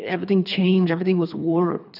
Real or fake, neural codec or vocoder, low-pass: fake; codec, 24 kHz, 3 kbps, HILCodec; 5.4 kHz